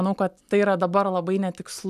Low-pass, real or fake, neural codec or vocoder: 14.4 kHz; real; none